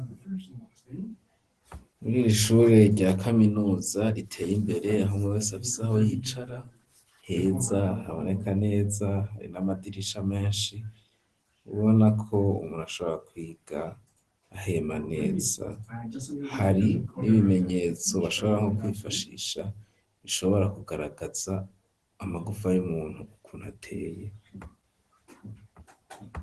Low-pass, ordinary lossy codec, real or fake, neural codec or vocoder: 10.8 kHz; Opus, 16 kbps; real; none